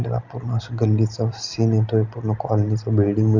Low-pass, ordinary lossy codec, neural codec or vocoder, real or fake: 7.2 kHz; none; none; real